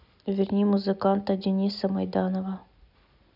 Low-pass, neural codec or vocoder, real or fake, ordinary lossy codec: 5.4 kHz; none; real; none